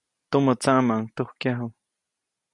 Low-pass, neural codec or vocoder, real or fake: 10.8 kHz; none; real